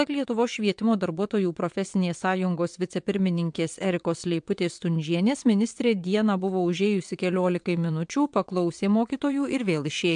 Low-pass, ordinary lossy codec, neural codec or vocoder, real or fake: 9.9 kHz; MP3, 64 kbps; vocoder, 22.05 kHz, 80 mel bands, WaveNeXt; fake